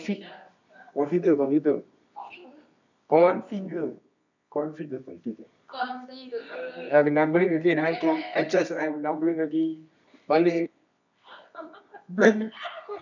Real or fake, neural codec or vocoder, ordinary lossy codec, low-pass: fake; codec, 24 kHz, 0.9 kbps, WavTokenizer, medium music audio release; none; 7.2 kHz